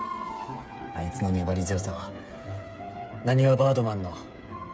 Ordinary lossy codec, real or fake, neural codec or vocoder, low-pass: none; fake; codec, 16 kHz, 8 kbps, FreqCodec, smaller model; none